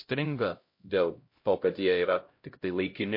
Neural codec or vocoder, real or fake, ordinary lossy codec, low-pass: codec, 16 kHz, 0.5 kbps, X-Codec, HuBERT features, trained on LibriSpeech; fake; MP3, 32 kbps; 5.4 kHz